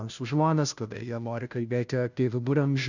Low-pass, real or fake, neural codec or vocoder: 7.2 kHz; fake; codec, 16 kHz, 0.5 kbps, FunCodec, trained on Chinese and English, 25 frames a second